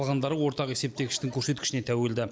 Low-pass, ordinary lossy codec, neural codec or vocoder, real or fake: none; none; none; real